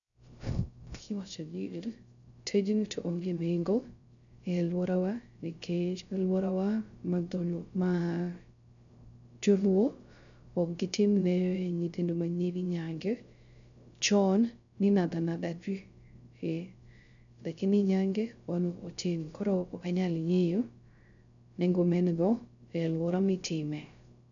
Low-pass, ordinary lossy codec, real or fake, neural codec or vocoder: 7.2 kHz; none; fake; codec, 16 kHz, 0.3 kbps, FocalCodec